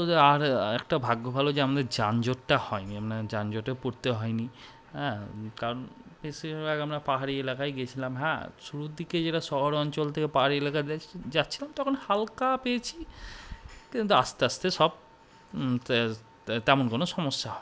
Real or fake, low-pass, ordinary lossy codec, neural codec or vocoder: real; none; none; none